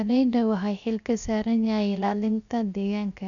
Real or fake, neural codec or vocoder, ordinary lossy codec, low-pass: fake; codec, 16 kHz, 0.7 kbps, FocalCodec; none; 7.2 kHz